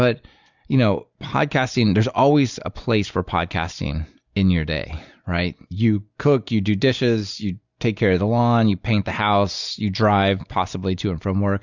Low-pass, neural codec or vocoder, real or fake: 7.2 kHz; none; real